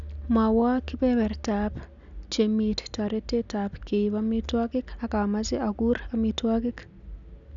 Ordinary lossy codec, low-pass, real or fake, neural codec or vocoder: none; 7.2 kHz; real; none